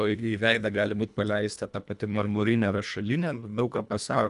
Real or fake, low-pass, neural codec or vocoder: fake; 10.8 kHz; codec, 24 kHz, 1.5 kbps, HILCodec